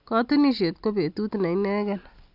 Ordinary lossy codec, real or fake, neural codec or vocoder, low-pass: AAC, 48 kbps; real; none; 5.4 kHz